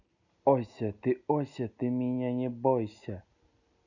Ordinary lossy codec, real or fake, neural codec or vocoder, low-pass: none; real; none; 7.2 kHz